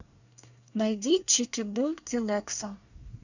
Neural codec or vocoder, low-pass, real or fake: codec, 24 kHz, 1 kbps, SNAC; 7.2 kHz; fake